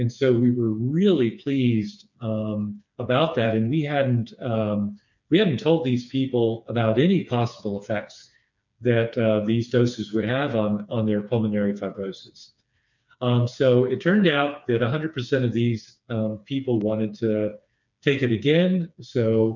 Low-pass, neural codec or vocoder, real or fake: 7.2 kHz; codec, 16 kHz, 4 kbps, FreqCodec, smaller model; fake